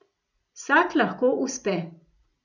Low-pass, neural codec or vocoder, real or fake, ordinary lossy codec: 7.2 kHz; none; real; none